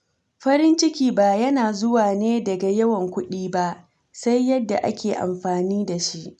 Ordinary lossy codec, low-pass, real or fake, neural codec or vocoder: none; 10.8 kHz; real; none